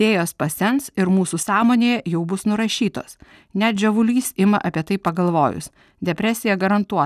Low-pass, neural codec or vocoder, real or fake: 14.4 kHz; none; real